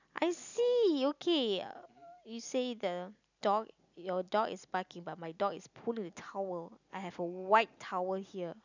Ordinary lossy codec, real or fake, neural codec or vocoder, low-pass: none; real; none; 7.2 kHz